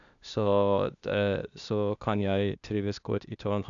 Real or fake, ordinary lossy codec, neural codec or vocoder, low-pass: fake; none; codec, 16 kHz, 0.8 kbps, ZipCodec; 7.2 kHz